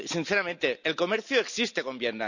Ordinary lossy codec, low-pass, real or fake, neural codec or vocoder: none; 7.2 kHz; real; none